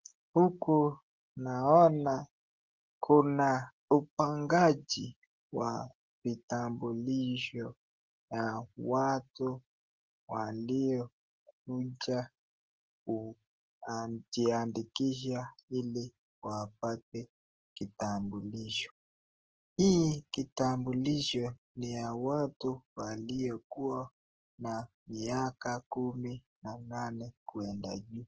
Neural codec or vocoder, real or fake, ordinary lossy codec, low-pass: none; real; Opus, 16 kbps; 7.2 kHz